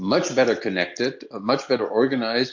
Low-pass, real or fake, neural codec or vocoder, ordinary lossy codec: 7.2 kHz; real; none; MP3, 48 kbps